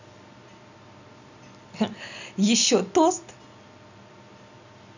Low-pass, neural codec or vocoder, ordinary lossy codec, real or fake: 7.2 kHz; none; none; real